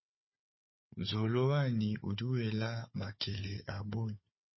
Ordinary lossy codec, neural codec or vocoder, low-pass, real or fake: MP3, 24 kbps; codec, 16 kHz, 4 kbps, FunCodec, trained on Chinese and English, 50 frames a second; 7.2 kHz; fake